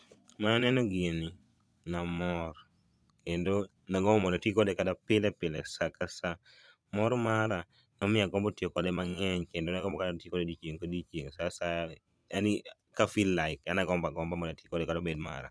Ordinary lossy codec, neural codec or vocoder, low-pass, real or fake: none; vocoder, 22.05 kHz, 80 mel bands, Vocos; none; fake